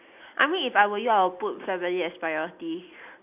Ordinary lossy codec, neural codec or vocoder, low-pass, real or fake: none; none; 3.6 kHz; real